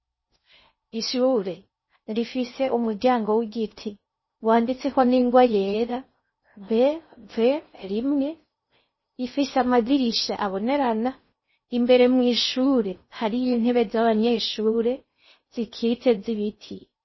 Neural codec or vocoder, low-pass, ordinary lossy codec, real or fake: codec, 16 kHz in and 24 kHz out, 0.6 kbps, FocalCodec, streaming, 2048 codes; 7.2 kHz; MP3, 24 kbps; fake